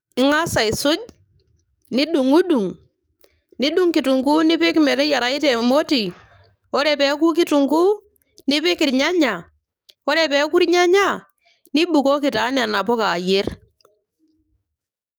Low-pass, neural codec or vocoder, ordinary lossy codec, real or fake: none; codec, 44.1 kHz, 7.8 kbps, DAC; none; fake